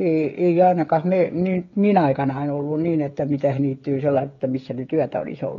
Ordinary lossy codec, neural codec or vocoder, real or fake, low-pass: AAC, 32 kbps; codec, 16 kHz, 16 kbps, FreqCodec, smaller model; fake; 7.2 kHz